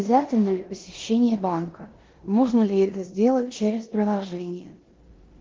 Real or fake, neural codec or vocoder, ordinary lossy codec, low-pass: fake; codec, 16 kHz in and 24 kHz out, 0.9 kbps, LongCat-Audio-Codec, four codebook decoder; Opus, 16 kbps; 7.2 kHz